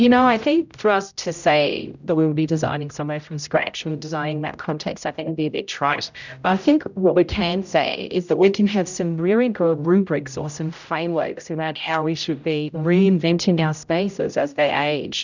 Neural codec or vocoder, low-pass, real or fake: codec, 16 kHz, 0.5 kbps, X-Codec, HuBERT features, trained on general audio; 7.2 kHz; fake